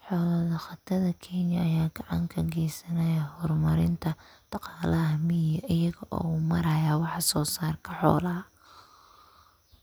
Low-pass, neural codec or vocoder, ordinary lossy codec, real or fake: none; none; none; real